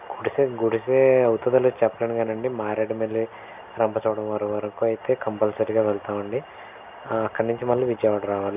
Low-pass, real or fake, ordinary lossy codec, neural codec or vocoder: 3.6 kHz; real; none; none